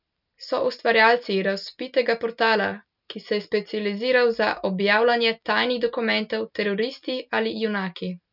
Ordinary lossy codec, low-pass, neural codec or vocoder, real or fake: none; 5.4 kHz; none; real